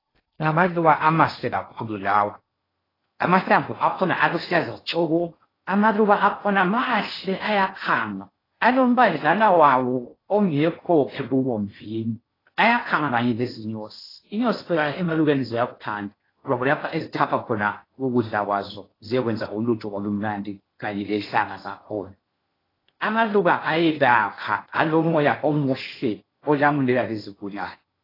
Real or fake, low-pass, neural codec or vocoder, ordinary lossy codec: fake; 5.4 kHz; codec, 16 kHz in and 24 kHz out, 0.6 kbps, FocalCodec, streaming, 4096 codes; AAC, 24 kbps